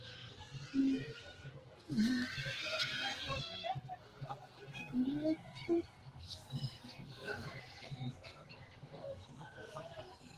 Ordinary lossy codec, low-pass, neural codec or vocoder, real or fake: Opus, 16 kbps; 14.4 kHz; codec, 44.1 kHz, 2.6 kbps, SNAC; fake